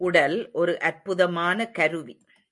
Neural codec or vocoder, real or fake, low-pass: none; real; 10.8 kHz